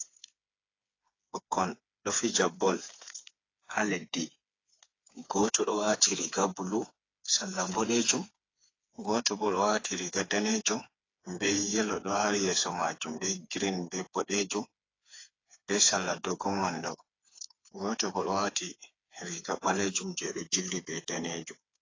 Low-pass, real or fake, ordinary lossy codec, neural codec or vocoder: 7.2 kHz; fake; AAC, 32 kbps; codec, 16 kHz, 4 kbps, FreqCodec, smaller model